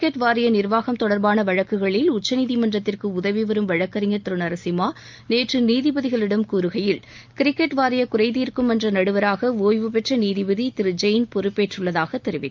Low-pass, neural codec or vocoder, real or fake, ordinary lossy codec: 7.2 kHz; none; real; Opus, 24 kbps